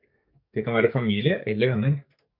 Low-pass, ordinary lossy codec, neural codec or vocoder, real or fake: 5.4 kHz; MP3, 48 kbps; codec, 44.1 kHz, 2.6 kbps, SNAC; fake